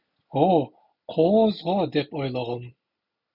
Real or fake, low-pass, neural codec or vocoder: real; 5.4 kHz; none